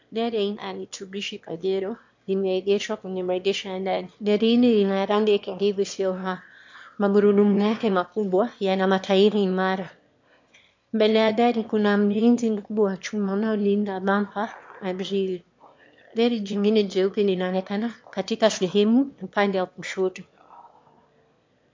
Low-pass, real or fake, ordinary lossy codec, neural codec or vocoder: 7.2 kHz; fake; MP3, 48 kbps; autoencoder, 22.05 kHz, a latent of 192 numbers a frame, VITS, trained on one speaker